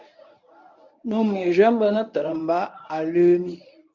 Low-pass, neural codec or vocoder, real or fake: 7.2 kHz; codec, 24 kHz, 0.9 kbps, WavTokenizer, medium speech release version 1; fake